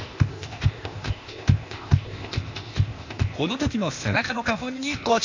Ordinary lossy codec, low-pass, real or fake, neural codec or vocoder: AAC, 48 kbps; 7.2 kHz; fake; codec, 16 kHz, 0.8 kbps, ZipCodec